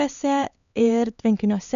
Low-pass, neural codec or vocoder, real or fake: 7.2 kHz; codec, 16 kHz, 4 kbps, X-Codec, HuBERT features, trained on LibriSpeech; fake